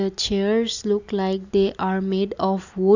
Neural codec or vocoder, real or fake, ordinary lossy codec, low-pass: none; real; none; 7.2 kHz